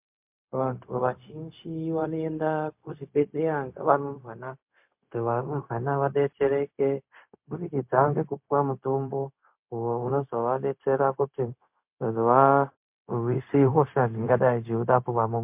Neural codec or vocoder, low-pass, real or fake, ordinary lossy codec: codec, 16 kHz, 0.4 kbps, LongCat-Audio-Codec; 3.6 kHz; fake; MP3, 32 kbps